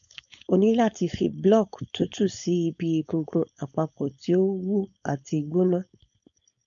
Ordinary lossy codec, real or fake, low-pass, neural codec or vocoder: none; fake; 7.2 kHz; codec, 16 kHz, 4.8 kbps, FACodec